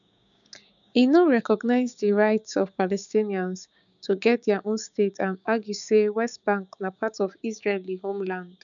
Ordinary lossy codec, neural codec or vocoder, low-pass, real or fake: none; codec, 16 kHz, 6 kbps, DAC; 7.2 kHz; fake